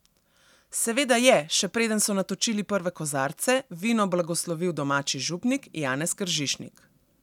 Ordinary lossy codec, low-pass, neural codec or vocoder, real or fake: none; 19.8 kHz; none; real